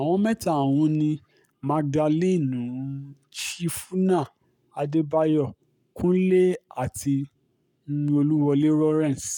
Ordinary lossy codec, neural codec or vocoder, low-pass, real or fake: none; codec, 44.1 kHz, 7.8 kbps, Pupu-Codec; 19.8 kHz; fake